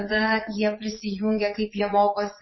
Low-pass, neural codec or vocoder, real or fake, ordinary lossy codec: 7.2 kHz; vocoder, 22.05 kHz, 80 mel bands, Vocos; fake; MP3, 24 kbps